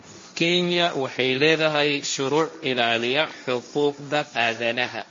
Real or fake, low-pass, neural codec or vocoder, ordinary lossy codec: fake; 7.2 kHz; codec, 16 kHz, 1.1 kbps, Voila-Tokenizer; MP3, 32 kbps